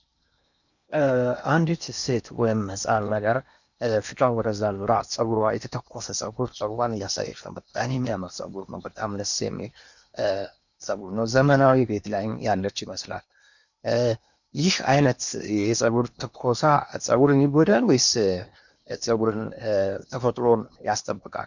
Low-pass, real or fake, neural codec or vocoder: 7.2 kHz; fake; codec, 16 kHz in and 24 kHz out, 0.8 kbps, FocalCodec, streaming, 65536 codes